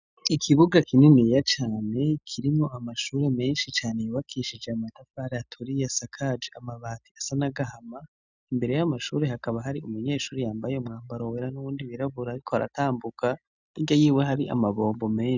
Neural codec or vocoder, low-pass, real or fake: none; 7.2 kHz; real